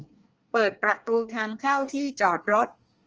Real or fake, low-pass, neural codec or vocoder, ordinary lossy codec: fake; 7.2 kHz; codec, 24 kHz, 1 kbps, SNAC; Opus, 24 kbps